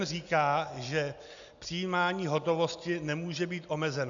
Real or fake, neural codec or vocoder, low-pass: real; none; 7.2 kHz